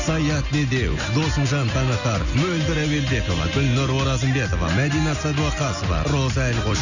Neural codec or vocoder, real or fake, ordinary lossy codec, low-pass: none; real; none; 7.2 kHz